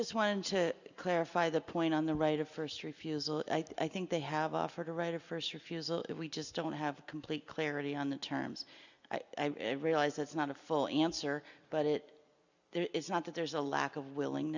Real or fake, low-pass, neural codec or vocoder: real; 7.2 kHz; none